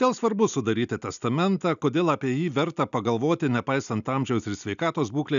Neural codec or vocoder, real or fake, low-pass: none; real; 7.2 kHz